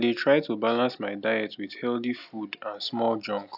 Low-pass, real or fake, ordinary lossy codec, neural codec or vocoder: 5.4 kHz; real; none; none